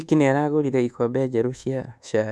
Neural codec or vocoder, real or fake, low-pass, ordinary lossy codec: codec, 24 kHz, 1.2 kbps, DualCodec; fake; none; none